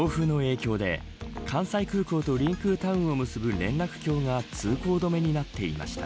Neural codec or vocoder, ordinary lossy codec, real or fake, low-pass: none; none; real; none